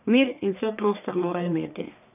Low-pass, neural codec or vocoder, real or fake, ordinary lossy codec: 3.6 kHz; codec, 44.1 kHz, 1.7 kbps, Pupu-Codec; fake; none